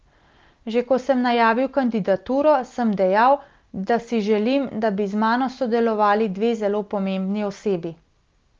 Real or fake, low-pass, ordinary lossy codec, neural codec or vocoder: real; 7.2 kHz; Opus, 32 kbps; none